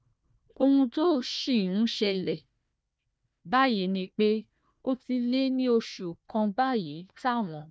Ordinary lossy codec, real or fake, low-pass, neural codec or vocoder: none; fake; none; codec, 16 kHz, 1 kbps, FunCodec, trained on Chinese and English, 50 frames a second